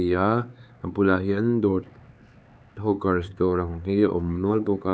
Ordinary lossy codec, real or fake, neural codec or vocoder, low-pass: none; fake; codec, 16 kHz, 4 kbps, X-Codec, HuBERT features, trained on LibriSpeech; none